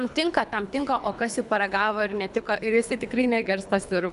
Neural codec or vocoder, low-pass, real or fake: codec, 24 kHz, 3 kbps, HILCodec; 10.8 kHz; fake